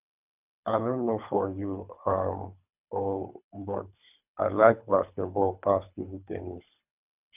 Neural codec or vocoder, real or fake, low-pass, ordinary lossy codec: codec, 24 kHz, 3 kbps, HILCodec; fake; 3.6 kHz; none